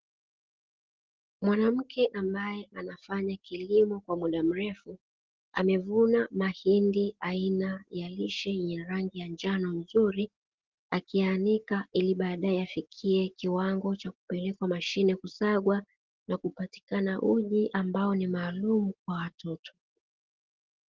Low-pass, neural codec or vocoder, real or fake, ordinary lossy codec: 7.2 kHz; none; real; Opus, 16 kbps